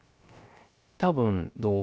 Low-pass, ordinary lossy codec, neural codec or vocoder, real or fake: none; none; codec, 16 kHz, 0.3 kbps, FocalCodec; fake